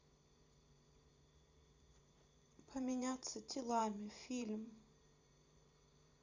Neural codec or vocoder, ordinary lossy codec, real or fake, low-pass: vocoder, 44.1 kHz, 128 mel bands every 512 samples, BigVGAN v2; Opus, 64 kbps; fake; 7.2 kHz